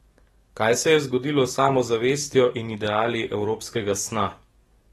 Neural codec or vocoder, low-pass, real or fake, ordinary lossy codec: codec, 44.1 kHz, 7.8 kbps, DAC; 19.8 kHz; fake; AAC, 32 kbps